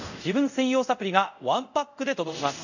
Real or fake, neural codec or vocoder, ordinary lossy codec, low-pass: fake; codec, 24 kHz, 0.5 kbps, DualCodec; none; 7.2 kHz